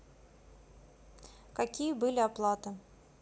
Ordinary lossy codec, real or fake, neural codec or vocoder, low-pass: none; real; none; none